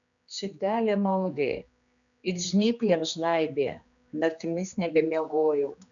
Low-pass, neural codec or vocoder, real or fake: 7.2 kHz; codec, 16 kHz, 2 kbps, X-Codec, HuBERT features, trained on general audio; fake